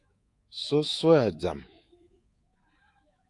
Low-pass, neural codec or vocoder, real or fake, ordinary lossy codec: 10.8 kHz; codec, 24 kHz, 3.1 kbps, DualCodec; fake; AAC, 48 kbps